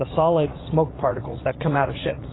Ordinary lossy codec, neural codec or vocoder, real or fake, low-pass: AAC, 16 kbps; vocoder, 44.1 kHz, 80 mel bands, Vocos; fake; 7.2 kHz